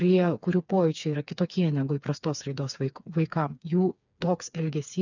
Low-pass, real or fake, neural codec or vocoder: 7.2 kHz; fake; codec, 16 kHz, 4 kbps, FreqCodec, smaller model